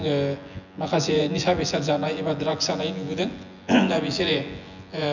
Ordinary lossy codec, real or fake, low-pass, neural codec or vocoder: none; fake; 7.2 kHz; vocoder, 24 kHz, 100 mel bands, Vocos